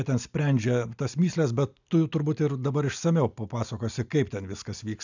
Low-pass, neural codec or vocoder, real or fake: 7.2 kHz; none; real